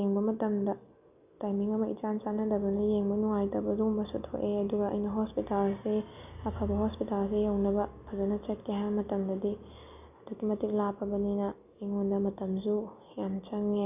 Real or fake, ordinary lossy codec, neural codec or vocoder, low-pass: real; none; none; 3.6 kHz